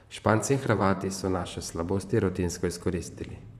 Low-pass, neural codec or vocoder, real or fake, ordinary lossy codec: 14.4 kHz; vocoder, 44.1 kHz, 128 mel bands, Pupu-Vocoder; fake; none